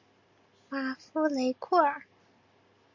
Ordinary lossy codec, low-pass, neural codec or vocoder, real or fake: MP3, 64 kbps; 7.2 kHz; none; real